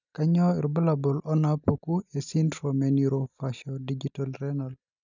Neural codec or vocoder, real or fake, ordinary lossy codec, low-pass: none; real; none; 7.2 kHz